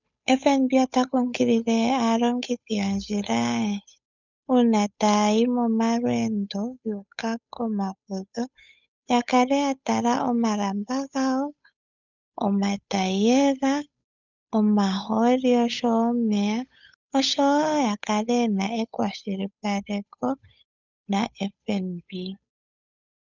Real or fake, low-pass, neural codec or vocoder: fake; 7.2 kHz; codec, 16 kHz, 8 kbps, FunCodec, trained on Chinese and English, 25 frames a second